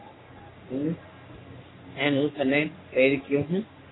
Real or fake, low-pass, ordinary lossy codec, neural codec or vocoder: fake; 7.2 kHz; AAC, 16 kbps; codec, 44.1 kHz, 3.4 kbps, Pupu-Codec